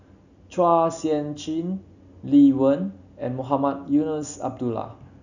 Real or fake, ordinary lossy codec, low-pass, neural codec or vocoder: real; none; 7.2 kHz; none